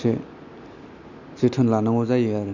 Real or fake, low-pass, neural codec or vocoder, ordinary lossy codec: real; 7.2 kHz; none; none